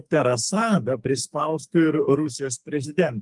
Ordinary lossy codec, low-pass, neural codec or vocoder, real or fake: Opus, 32 kbps; 10.8 kHz; codec, 44.1 kHz, 2.6 kbps, SNAC; fake